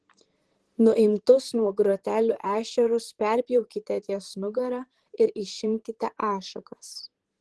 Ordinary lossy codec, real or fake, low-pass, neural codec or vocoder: Opus, 16 kbps; fake; 10.8 kHz; vocoder, 44.1 kHz, 128 mel bands, Pupu-Vocoder